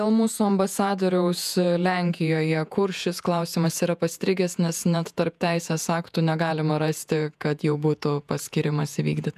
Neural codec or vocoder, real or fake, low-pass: vocoder, 48 kHz, 128 mel bands, Vocos; fake; 14.4 kHz